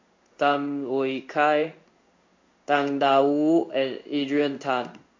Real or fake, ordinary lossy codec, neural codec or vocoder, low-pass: fake; MP3, 48 kbps; codec, 16 kHz in and 24 kHz out, 1 kbps, XY-Tokenizer; 7.2 kHz